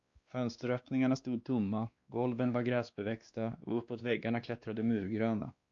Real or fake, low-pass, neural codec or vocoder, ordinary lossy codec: fake; 7.2 kHz; codec, 16 kHz, 2 kbps, X-Codec, WavLM features, trained on Multilingual LibriSpeech; Opus, 64 kbps